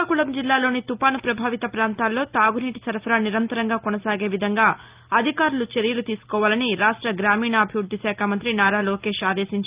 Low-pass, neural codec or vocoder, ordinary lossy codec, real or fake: 3.6 kHz; none; Opus, 32 kbps; real